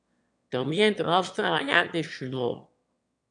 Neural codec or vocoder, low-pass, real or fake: autoencoder, 22.05 kHz, a latent of 192 numbers a frame, VITS, trained on one speaker; 9.9 kHz; fake